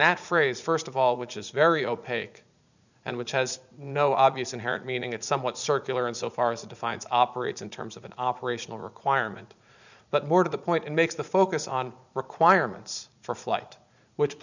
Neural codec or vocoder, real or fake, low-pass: vocoder, 44.1 kHz, 80 mel bands, Vocos; fake; 7.2 kHz